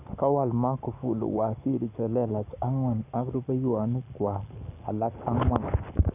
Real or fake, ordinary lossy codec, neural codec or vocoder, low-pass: fake; none; codec, 44.1 kHz, 7.8 kbps, Pupu-Codec; 3.6 kHz